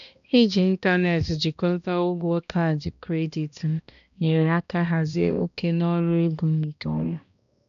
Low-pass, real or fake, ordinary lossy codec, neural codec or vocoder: 7.2 kHz; fake; none; codec, 16 kHz, 1 kbps, X-Codec, HuBERT features, trained on balanced general audio